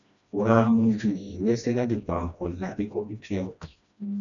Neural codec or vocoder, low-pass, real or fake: codec, 16 kHz, 1 kbps, FreqCodec, smaller model; 7.2 kHz; fake